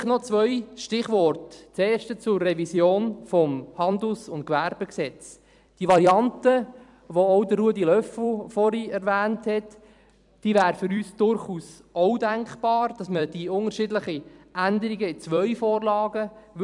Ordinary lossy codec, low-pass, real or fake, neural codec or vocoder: none; 10.8 kHz; fake; vocoder, 44.1 kHz, 128 mel bands every 256 samples, BigVGAN v2